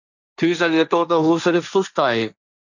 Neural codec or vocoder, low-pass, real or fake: codec, 16 kHz, 1.1 kbps, Voila-Tokenizer; 7.2 kHz; fake